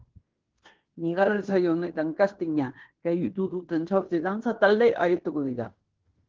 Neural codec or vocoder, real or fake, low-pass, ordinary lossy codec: codec, 16 kHz in and 24 kHz out, 0.9 kbps, LongCat-Audio-Codec, fine tuned four codebook decoder; fake; 7.2 kHz; Opus, 16 kbps